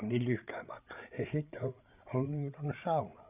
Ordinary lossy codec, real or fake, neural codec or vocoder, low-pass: none; fake; codec, 16 kHz, 8 kbps, FreqCodec, larger model; 3.6 kHz